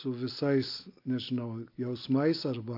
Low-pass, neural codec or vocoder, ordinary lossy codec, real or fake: 5.4 kHz; none; AAC, 32 kbps; real